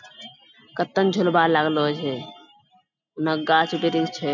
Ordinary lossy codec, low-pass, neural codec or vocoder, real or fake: none; 7.2 kHz; none; real